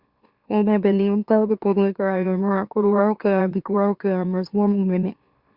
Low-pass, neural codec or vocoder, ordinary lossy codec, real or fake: 5.4 kHz; autoencoder, 44.1 kHz, a latent of 192 numbers a frame, MeloTTS; Opus, 64 kbps; fake